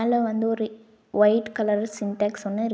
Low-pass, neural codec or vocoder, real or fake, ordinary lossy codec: none; none; real; none